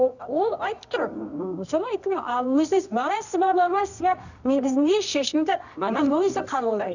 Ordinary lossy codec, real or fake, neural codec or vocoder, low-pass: none; fake; codec, 24 kHz, 0.9 kbps, WavTokenizer, medium music audio release; 7.2 kHz